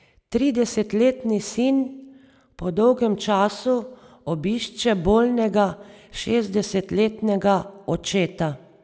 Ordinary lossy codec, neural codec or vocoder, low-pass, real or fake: none; none; none; real